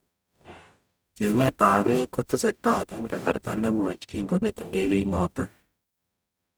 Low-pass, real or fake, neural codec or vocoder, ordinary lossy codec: none; fake; codec, 44.1 kHz, 0.9 kbps, DAC; none